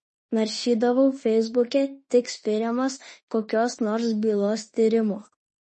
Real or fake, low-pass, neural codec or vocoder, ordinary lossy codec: fake; 10.8 kHz; autoencoder, 48 kHz, 32 numbers a frame, DAC-VAE, trained on Japanese speech; MP3, 32 kbps